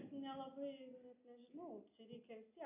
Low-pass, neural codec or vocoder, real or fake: 3.6 kHz; none; real